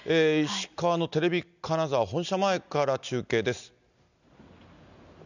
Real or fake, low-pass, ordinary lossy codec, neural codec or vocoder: real; 7.2 kHz; none; none